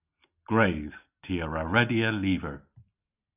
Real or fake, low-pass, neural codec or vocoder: real; 3.6 kHz; none